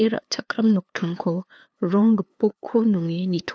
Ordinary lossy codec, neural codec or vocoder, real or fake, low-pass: none; codec, 16 kHz, 2 kbps, FunCodec, trained on LibriTTS, 25 frames a second; fake; none